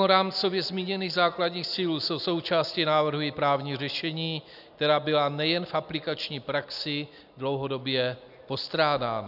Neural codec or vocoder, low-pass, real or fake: none; 5.4 kHz; real